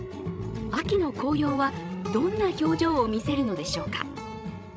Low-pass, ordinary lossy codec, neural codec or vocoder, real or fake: none; none; codec, 16 kHz, 16 kbps, FreqCodec, larger model; fake